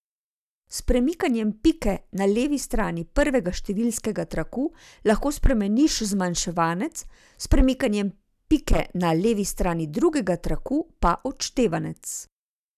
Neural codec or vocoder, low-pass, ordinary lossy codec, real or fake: none; 14.4 kHz; none; real